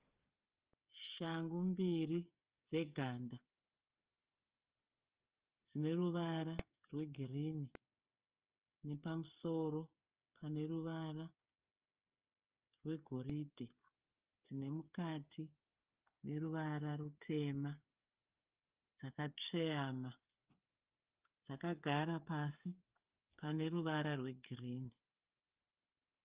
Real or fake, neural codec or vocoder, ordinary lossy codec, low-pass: fake; codec, 16 kHz, 8 kbps, FreqCodec, smaller model; Opus, 24 kbps; 3.6 kHz